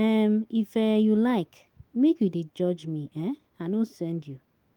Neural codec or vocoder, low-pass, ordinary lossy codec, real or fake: autoencoder, 48 kHz, 128 numbers a frame, DAC-VAE, trained on Japanese speech; 19.8 kHz; Opus, 24 kbps; fake